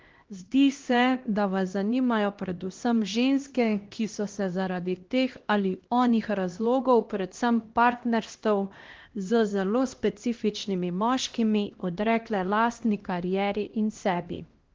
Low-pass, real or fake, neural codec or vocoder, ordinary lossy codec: 7.2 kHz; fake; codec, 16 kHz, 1 kbps, X-Codec, HuBERT features, trained on LibriSpeech; Opus, 16 kbps